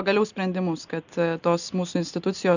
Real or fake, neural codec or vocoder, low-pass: real; none; 7.2 kHz